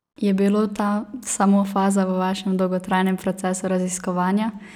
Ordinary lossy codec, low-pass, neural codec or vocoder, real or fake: none; 19.8 kHz; none; real